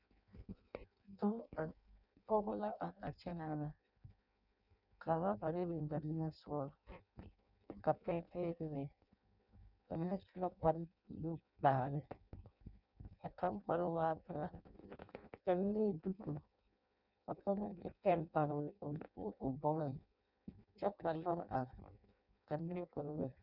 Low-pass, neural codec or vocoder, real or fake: 5.4 kHz; codec, 16 kHz in and 24 kHz out, 0.6 kbps, FireRedTTS-2 codec; fake